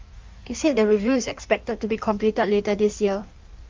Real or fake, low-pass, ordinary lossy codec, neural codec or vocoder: fake; 7.2 kHz; Opus, 32 kbps; codec, 16 kHz in and 24 kHz out, 1.1 kbps, FireRedTTS-2 codec